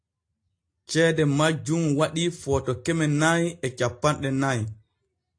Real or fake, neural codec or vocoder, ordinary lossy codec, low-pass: real; none; AAC, 48 kbps; 9.9 kHz